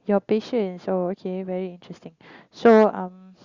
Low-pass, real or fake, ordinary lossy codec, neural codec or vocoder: 7.2 kHz; real; Opus, 64 kbps; none